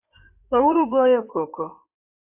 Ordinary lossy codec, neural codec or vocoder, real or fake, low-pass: AAC, 32 kbps; codec, 16 kHz in and 24 kHz out, 2.2 kbps, FireRedTTS-2 codec; fake; 3.6 kHz